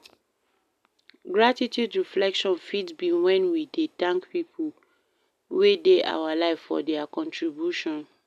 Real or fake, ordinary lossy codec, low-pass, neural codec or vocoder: real; none; 14.4 kHz; none